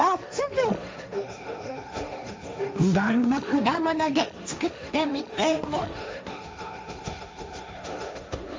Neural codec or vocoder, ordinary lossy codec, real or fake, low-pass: codec, 16 kHz, 1.1 kbps, Voila-Tokenizer; none; fake; none